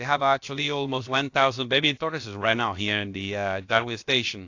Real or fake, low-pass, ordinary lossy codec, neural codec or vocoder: fake; 7.2 kHz; AAC, 48 kbps; codec, 16 kHz, about 1 kbps, DyCAST, with the encoder's durations